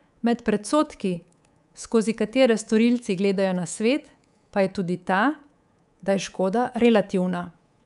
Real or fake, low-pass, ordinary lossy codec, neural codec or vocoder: fake; 10.8 kHz; none; codec, 24 kHz, 3.1 kbps, DualCodec